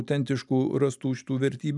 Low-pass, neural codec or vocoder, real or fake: 10.8 kHz; none; real